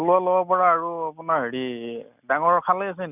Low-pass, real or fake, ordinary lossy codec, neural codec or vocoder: 3.6 kHz; real; none; none